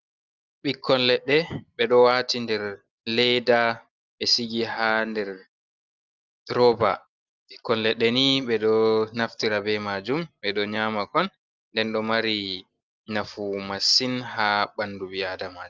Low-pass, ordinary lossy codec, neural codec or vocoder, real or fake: 7.2 kHz; Opus, 24 kbps; none; real